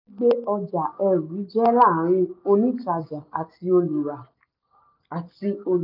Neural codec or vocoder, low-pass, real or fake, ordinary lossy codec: none; 5.4 kHz; real; none